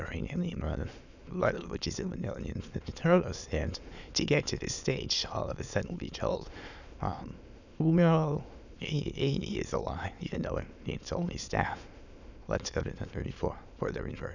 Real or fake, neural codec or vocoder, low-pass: fake; autoencoder, 22.05 kHz, a latent of 192 numbers a frame, VITS, trained on many speakers; 7.2 kHz